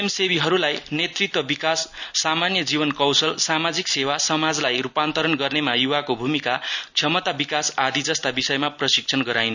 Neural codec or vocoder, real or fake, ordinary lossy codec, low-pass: none; real; none; 7.2 kHz